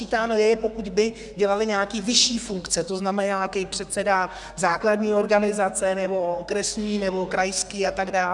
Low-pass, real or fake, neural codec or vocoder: 10.8 kHz; fake; codec, 32 kHz, 1.9 kbps, SNAC